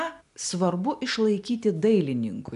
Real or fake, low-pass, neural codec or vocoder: real; 10.8 kHz; none